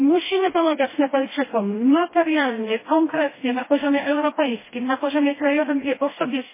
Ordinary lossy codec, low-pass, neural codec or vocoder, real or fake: MP3, 16 kbps; 3.6 kHz; codec, 16 kHz, 1 kbps, FreqCodec, smaller model; fake